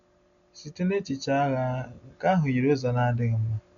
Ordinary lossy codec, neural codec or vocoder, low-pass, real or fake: none; none; 7.2 kHz; real